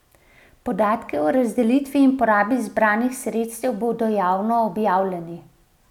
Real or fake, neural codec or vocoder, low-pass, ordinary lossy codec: real; none; 19.8 kHz; none